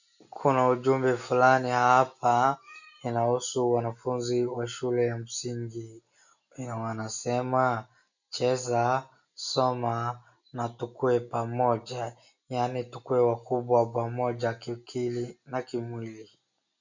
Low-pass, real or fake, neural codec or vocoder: 7.2 kHz; real; none